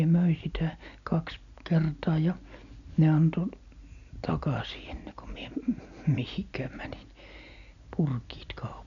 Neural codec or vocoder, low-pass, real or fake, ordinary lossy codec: none; 7.2 kHz; real; none